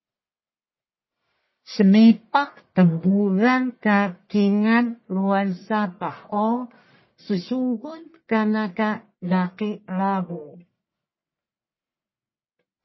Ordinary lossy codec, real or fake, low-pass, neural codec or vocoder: MP3, 24 kbps; fake; 7.2 kHz; codec, 44.1 kHz, 1.7 kbps, Pupu-Codec